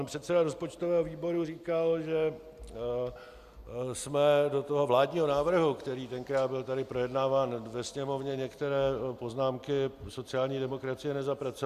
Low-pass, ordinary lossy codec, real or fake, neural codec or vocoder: 14.4 kHz; Opus, 64 kbps; real; none